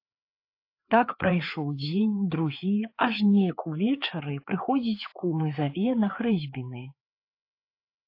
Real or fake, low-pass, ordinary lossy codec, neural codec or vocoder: fake; 5.4 kHz; AAC, 32 kbps; codec, 16 kHz, 4 kbps, FreqCodec, larger model